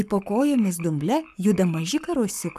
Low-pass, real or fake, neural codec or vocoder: 14.4 kHz; fake; codec, 44.1 kHz, 7.8 kbps, Pupu-Codec